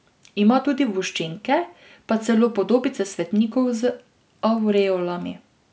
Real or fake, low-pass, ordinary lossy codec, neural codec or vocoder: real; none; none; none